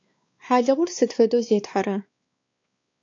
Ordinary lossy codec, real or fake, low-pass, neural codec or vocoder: AAC, 48 kbps; fake; 7.2 kHz; codec, 16 kHz, 4 kbps, X-Codec, WavLM features, trained on Multilingual LibriSpeech